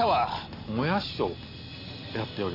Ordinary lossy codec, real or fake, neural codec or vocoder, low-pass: AAC, 32 kbps; real; none; 5.4 kHz